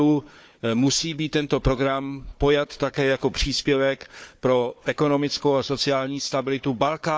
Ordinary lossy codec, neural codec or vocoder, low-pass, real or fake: none; codec, 16 kHz, 4 kbps, FunCodec, trained on Chinese and English, 50 frames a second; none; fake